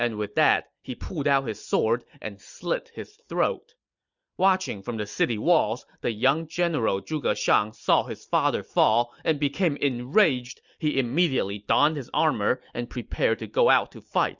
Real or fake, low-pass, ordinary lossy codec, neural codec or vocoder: real; 7.2 kHz; Opus, 64 kbps; none